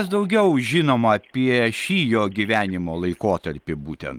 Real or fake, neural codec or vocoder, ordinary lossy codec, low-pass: real; none; Opus, 24 kbps; 19.8 kHz